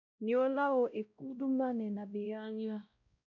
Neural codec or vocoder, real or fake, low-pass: codec, 16 kHz, 0.5 kbps, X-Codec, WavLM features, trained on Multilingual LibriSpeech; fake; 7.2 kHz